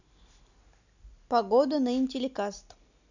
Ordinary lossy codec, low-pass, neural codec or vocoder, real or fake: none; 7.2 kHz; none; real